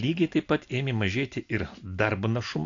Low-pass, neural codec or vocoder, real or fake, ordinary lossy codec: 7.2 kHz; none; real; AAC, 48 kbps